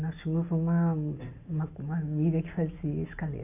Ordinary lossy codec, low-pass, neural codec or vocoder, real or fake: none; 3.6 kHz; none; real